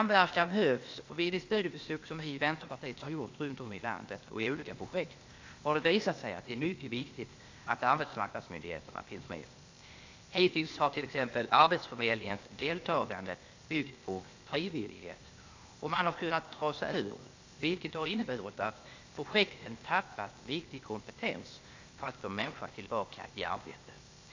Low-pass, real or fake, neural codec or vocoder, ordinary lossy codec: 7.2 kHz; fake; codec, 16 kHz, 0.8 kbps, ZipCodec; AAC, 48 kbps